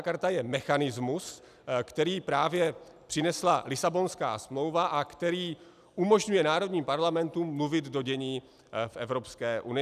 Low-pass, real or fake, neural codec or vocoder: 14.4 kHz; real; none